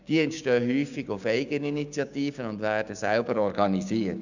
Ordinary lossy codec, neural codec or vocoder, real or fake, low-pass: none; codec, 16 kHz, 6 kbps, DAC; fake; 7.2 kHz